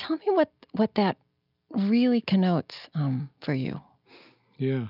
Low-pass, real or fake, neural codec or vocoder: 5.4 kHz; real; none